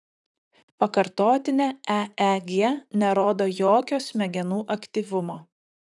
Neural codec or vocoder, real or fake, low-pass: vocoder, 44.1 kHz, 128 mel bands, Pupu-Vocoder; fake; 10.8 kHz